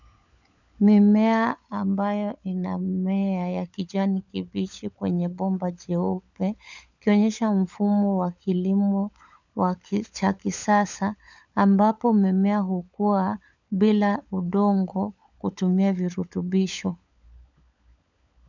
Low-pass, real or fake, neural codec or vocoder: 7.2 kHz; fake; codec, 16 kHz, 16 kbps, FunCodec, trained on LibriTTS, 50 frames a second